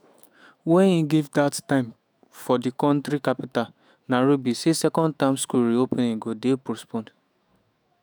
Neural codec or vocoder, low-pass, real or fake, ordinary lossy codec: autoencoder, 48 kHz, 128 numbers a frame, DAC-VAE, trained on Japanese speech; none; fake; none